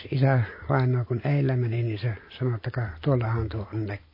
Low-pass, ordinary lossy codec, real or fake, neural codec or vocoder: 5.4 kHz; MP3, 24 kbps; real; none